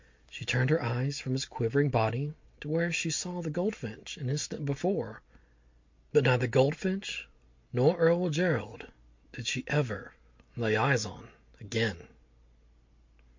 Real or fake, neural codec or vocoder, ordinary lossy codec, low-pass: real; none; MP3, 48 kbps; 7.2 kHz